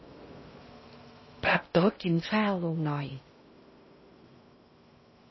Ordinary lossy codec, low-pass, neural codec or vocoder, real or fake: MP3, 24 kbps; 7.2 kHz; codec, 16 kHz in and 24 kHz out, 0.6 kbps, FocalCodec, streaming, 2048 codes; fake